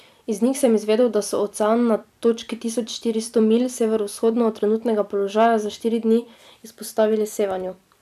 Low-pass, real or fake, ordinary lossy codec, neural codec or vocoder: 14.4 kHz; fake; none; vocoder, 44.1 kHz, 128 mel bands every 256 samples, BigVGAN v2